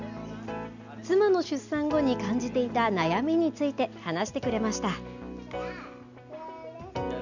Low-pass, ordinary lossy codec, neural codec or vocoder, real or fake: 7.2 kHz; none; none; real